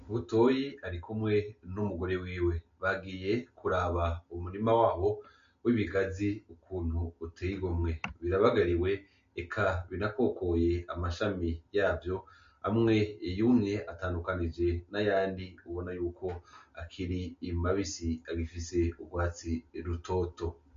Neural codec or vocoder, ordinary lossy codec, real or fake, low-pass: none; MP3, 48 kbps; real; 7.2 kHz